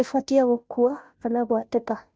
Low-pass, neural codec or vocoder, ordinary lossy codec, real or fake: none; codec, 16 kHz, 0.5 kbps, FunCodec, trained on Chinese and English, 25 frames a second; none; fake